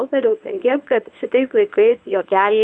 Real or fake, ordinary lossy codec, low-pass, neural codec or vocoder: fake; Opus, 64 kbps; 9.9 kHz; codec, 24 kHz, 0.9 kbps, WavTokenizer, medium speech release version 1